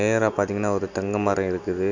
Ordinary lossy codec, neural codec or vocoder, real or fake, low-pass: none; none; real; 7.2 kHz